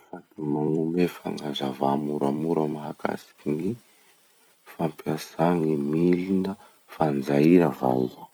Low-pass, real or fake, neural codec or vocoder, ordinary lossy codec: none; fake; vocoder, 44.1 kHz, 128 mel bands every 256 samples, BigVGAN v2; none